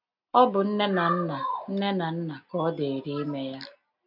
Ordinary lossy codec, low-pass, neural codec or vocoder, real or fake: none; 5.4 kHz; none; real